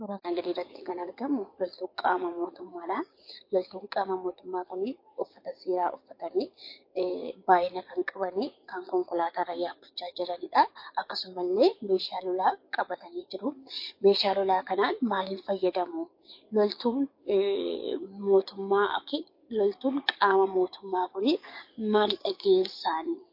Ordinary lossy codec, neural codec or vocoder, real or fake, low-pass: MP3, 32 kbps; codec, 44.1 kHz, 7.8 kbps, DAC; fake; 5.4 kHz